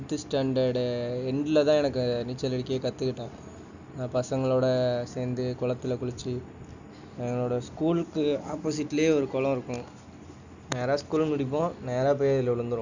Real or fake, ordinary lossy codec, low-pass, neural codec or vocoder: real; none; 7.2 kHz; none